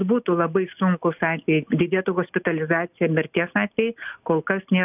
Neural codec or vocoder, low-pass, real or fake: none; 3.6 kHz; real